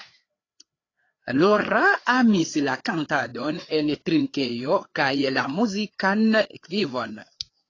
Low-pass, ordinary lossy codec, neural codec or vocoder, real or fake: 7.2 kHz; AAC, 32 kbps; codec, 16 kHz, 4 kbps, FreqCodec, larger model; fake